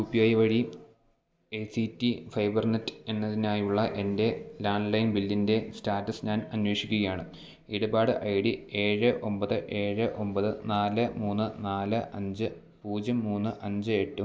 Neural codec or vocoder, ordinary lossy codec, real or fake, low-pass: none; none; real; none